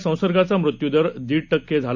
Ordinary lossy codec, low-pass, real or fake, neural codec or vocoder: none; 7.2 kHz; real; none